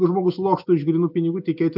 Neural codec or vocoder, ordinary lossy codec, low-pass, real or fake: none; AAC, 48 kbps; 5.4 kHz; real